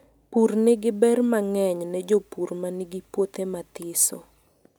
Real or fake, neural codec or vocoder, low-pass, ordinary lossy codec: real; none; none; none